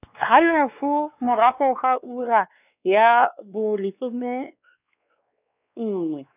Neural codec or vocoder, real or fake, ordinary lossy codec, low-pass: codec, 16 kHz, 2 kbps, X-Codec, WavLM features, trained on Multilingual LibriSpeech; fake; none; 3.6 kHz